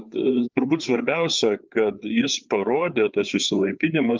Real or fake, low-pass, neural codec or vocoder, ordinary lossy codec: fake; 7.2 kHz; codec, 16 kHz, 4 kbps, FreqCodec, larger model; Opus, 24 kbps